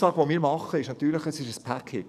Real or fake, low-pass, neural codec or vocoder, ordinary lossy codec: fake; 14.4 kHz; codec, 44.1 kHz, 7.8 kbps, DAC; none